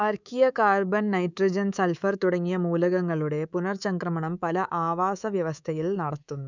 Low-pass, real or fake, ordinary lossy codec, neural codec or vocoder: 7.2 kHz; fake; none; codec, 24 kHz, 3.1 kbps, DualCodec